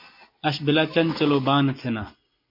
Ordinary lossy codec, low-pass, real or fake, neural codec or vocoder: MP3, 32 kbps; 5.4 kHz; real; none